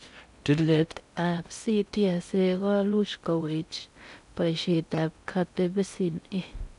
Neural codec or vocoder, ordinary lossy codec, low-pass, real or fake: codec, 16 kHz in and 24 kHz out, 0.6 kbps, FocalCodec, streaming, 4096 codes; none; 10.8 kHz; fake